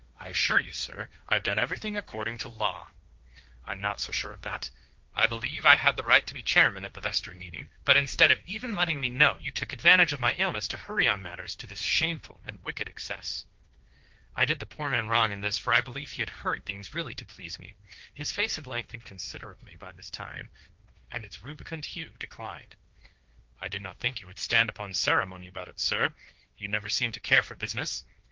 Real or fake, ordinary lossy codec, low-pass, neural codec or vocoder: fake; Opus, 32 kbps; 7.2 kHz; codec, 16 kHz, 1.1 kbps, Voila-Tokenizer